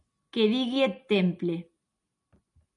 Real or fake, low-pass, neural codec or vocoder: real; 10.8 kHz; none